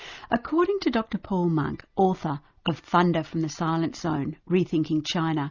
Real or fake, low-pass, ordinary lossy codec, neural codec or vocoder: real; 7.2 kHz; Opus, 64 kbps; none